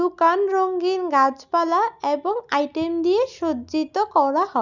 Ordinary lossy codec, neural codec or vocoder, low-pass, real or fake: none; none; 7.2 kHz; real